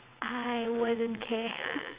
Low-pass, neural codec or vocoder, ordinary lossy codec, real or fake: 3.6 kHz; vocoder, 22.05 kHz, 80 mel bands, WaveNeXt; Opus, 64 kbps; fake